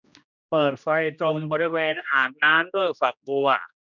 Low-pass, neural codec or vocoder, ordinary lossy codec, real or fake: 7.2 kHz; codec, 16 kHz, 1 kbps, X-Codec, HuBERT features, trained on general audio; none; fake